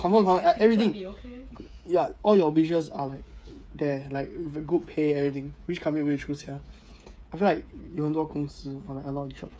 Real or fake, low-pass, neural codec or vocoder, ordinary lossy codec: fake; none; codec, 16 kHz, 8 kbps, FreqCodec, smaller model; none